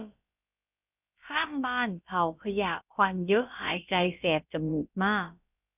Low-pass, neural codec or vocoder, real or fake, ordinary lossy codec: 3.6 kHz; codec, 16 kHz, about 1 kbps, DyCAST, with the encoder's durations; fake; none